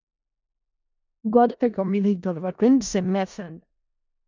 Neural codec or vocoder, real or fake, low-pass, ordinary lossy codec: codec, 16 kHz in and 24 kHz out, 0.4 kbps, LongCat-Audio-Codec, four codebook decoder; fake; 7.2 kHz; MP3, 64 kbps